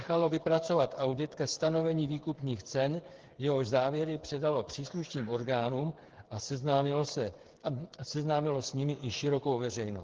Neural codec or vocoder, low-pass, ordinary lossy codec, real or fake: codec, 16 kHz, 8 kbps, FreqCodec, smaller model; 7.2 kHz; Opus, 16 kbps; fake